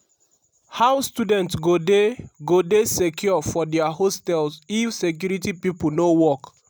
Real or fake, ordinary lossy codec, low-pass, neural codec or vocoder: real; none; none; none